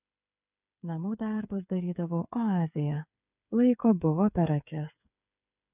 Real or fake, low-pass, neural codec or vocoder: fake; 3.6 kHz; codec, 16 kHz, 8 kbps, FreqCodec, smaller model